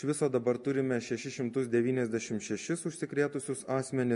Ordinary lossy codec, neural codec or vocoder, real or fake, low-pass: MP3, 48 kbps; none; real; 10.8 kHz